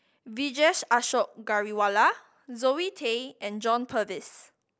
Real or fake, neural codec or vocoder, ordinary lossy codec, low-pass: real; none; none; none